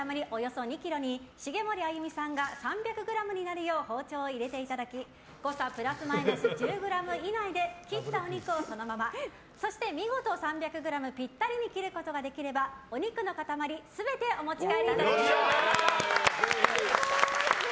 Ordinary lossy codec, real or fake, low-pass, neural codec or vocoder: none; real; none; none